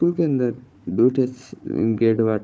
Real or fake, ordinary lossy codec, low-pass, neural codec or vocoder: fake; none; none; codec, 16 kHz, 4 kbps, FunCodec, trained on Chinese and English, 50 frames a second